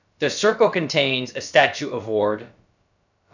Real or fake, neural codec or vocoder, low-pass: fake; codec, 16 kHz, about 1 kbps, DyCAST, with the encoder's durations; 7.2 kHz